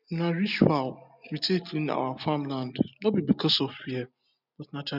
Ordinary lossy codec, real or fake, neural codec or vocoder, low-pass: none; real; none; 5.4 kHz